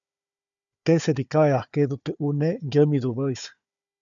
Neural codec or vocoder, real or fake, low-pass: codec, 16 kHz, 4 kbps, FunCodec, trained on Chinese and English, 50 frames a second; fake; 7.2 kHz